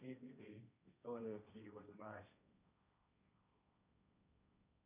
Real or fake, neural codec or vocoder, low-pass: fake; codec, 16 kHz, 1.1 kbps, Voila-Tokenizer; 3.6 kHz